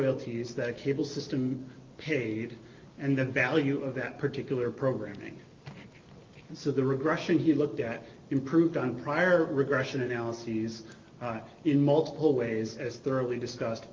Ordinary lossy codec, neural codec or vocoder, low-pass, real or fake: Opus, 32 kbps; none; 7.2 kHz; real